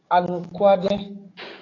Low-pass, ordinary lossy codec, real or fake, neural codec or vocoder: 7.2 kHz; AAC, 32 kbps; fake; codec, 44.1 kHz, 7.8 kbps, DAC